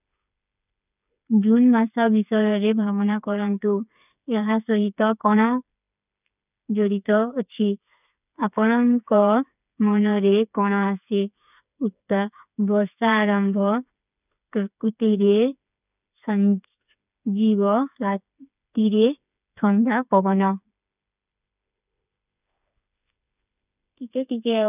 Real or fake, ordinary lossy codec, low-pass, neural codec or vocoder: fake; none; 3.6 kHz; codec, 16 kHz, 4 kbps, FreqCodec, smaller model